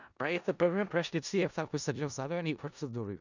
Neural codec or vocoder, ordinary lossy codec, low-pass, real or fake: codec, 16 kHz in and 24 kHz out, 0.4 kbps, LongCat-Audio-Codec, four codebook decoder; none; 7.2 kHz; fake